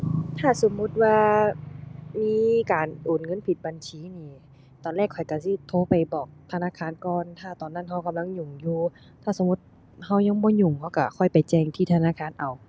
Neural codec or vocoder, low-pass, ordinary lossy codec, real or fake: none; none; none; real